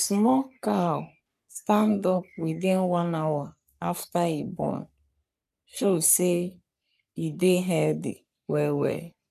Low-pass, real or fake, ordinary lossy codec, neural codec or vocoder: 14.4 kHz; fake; none; codec, 44.1 kHz, 3.4 kbps, Pupu-Codec